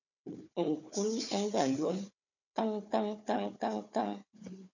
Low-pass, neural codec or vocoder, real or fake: 7.2 kHz; codec, 16 kHz, 4 kbps, FunCodec, trained on Chinese and English, 50 frames a second; fake